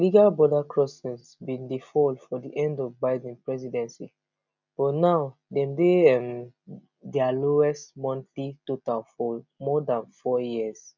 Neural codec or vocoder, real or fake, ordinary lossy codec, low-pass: none; real; none; 7.2 kHz